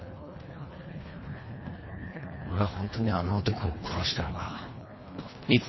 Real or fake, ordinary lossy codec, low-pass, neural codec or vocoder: fake; MP3, 24 kbps; 7.2 kHz; codec, 24 kHz, 1.5 kbps, HILCodec